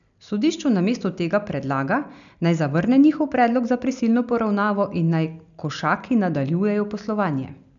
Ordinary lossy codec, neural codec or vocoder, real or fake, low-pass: none; none; real; 7.2 kHz